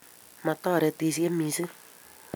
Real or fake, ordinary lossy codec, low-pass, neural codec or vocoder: real; none; none; none